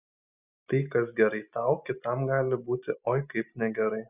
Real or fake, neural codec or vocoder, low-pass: real; none; 3.6 kHz